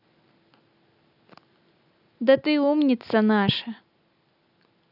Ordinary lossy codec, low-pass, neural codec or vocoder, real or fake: none; 5.4 kHz; none; real